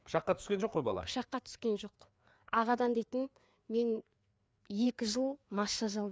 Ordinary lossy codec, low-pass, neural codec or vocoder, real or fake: none; none; codec, 16 kHz, 4 kbps, FreqCodec, larger model; fake